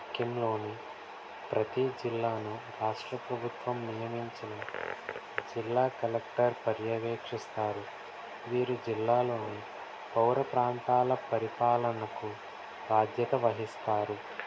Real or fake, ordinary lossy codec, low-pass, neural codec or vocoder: real; none; none; none